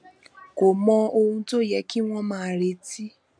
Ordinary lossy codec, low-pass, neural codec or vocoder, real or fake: MP3, 96 kbps; 9.9 kHz; none; real